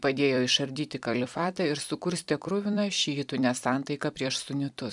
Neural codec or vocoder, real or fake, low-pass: vocoder, 44.1 kHz, 128 mel bands every 256 samples, BigVGAN v2; fake; 10.8 kHz